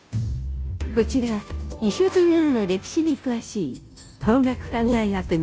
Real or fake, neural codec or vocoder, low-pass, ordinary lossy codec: fake; codec, 16 kHz, 0.5 kbps, FunCodec, trained on Chinese and English, 25 frames a second; none; none